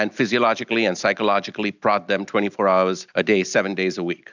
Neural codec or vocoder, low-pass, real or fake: none; 7.2 kHz; real